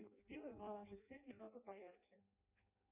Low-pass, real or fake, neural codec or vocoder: 3.6 kHz; fake; codec, 16 kHz in and 24 kHz out, 0.6 kbps, FireRedTTS-2 codec